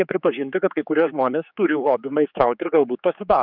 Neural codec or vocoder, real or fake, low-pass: codec, 16 kHz, 4 kbps, X-Codec, HuBERT features, trained on general audio; fake; 5.4 kHz